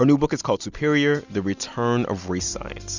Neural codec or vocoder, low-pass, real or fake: none; 7.2 kHz; real